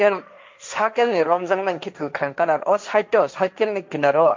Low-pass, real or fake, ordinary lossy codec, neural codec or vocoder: 7.2 kHz; fake; MP3, 64 kbps; codec, 16 kHz, 1.1 kbps, Voila-Tokenizer